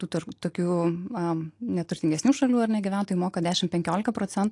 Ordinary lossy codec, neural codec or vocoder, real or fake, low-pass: AAC, 48 kbps; none; real; 10.8 kHz